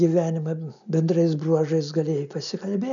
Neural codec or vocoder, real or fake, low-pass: none; real; 7.2 kHz